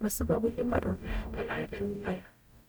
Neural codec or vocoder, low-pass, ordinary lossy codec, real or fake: codec, 44.1 kHz, 0.9 kbps, DAC; none; none; fake